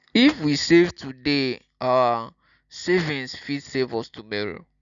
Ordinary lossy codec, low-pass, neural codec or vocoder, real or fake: none; 7.2 kHz; none; real